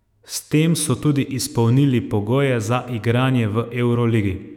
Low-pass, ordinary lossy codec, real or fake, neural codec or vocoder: 19.8 kHz; none; fake; autoencoder, 48 kHz, 128 numbers a frame, DAC-VAE, trained on Japanese speech